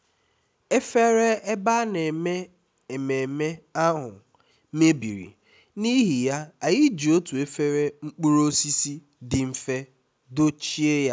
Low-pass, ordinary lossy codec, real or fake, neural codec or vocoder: none; none; real; none